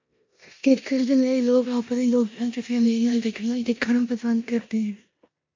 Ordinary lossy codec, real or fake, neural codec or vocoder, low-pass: MP3, 48 kbps; fake; codec, 16 kHz in and 24 kHz out, 0.9 kbps, LongCat-Audio-Codec, four codebook decoder; 7.2 kHz